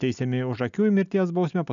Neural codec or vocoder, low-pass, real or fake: none; 7.2 kHz; real